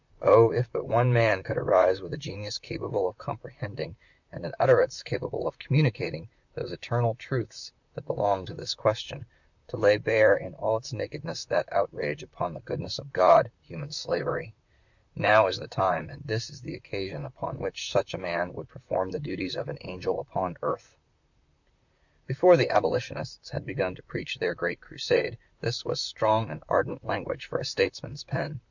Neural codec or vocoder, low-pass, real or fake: vocoder, 44.1 kHz, 128 mel bands, Pupu-Vocoder; 7.2 kHz; fake